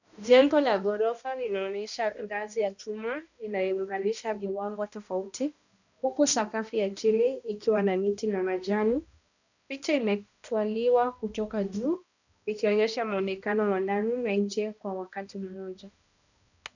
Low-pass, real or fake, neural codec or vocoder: 7.2 kHz; fake; codec, 16 kHz, 1 kbps, X-Codec, HuBERT features, trained on balanced general audio